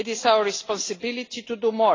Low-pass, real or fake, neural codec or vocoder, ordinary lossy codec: 7.2 kHz; real; none; AAC, 32 kbps